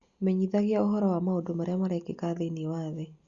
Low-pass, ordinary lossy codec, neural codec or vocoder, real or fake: 7.2 kHz; Opus, 64 kbps; none; real